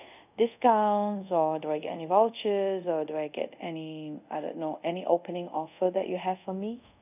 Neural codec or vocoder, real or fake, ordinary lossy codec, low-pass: codec, 24 kHz, 0.5 kbps, DualCodec; fake; none; 3.6 kHz